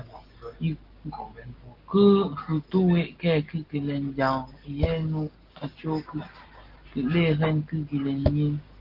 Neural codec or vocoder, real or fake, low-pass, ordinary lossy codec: none; real; 5.4 kHz; Opus, 16 kbps